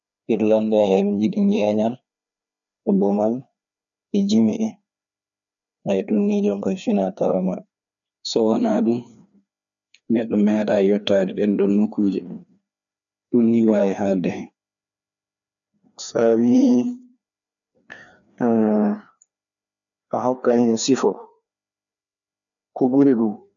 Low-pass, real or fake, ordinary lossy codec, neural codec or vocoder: 7.2 kHz; fake; none; codec, 16 kHz, 2 kbps, FreqCodec, larger model